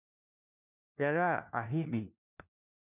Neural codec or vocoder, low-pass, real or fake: codec, 16 kHz, 1 kbps, FunCodec, trained on LibriTTS, 50 frames a second; 3.6 kHz; fake